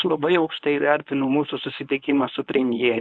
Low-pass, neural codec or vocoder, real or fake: 10.8 kHz; codec, 24 kHz, 0.9 kbps, WavTokenizer, medium speech release version 1; fake